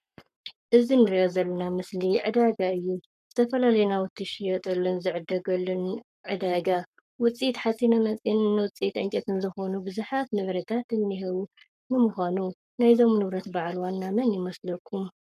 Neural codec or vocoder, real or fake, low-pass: codec, 44.1 kHz, 7.8 kbps, Pupu-Codec; fake; 14.4 kHz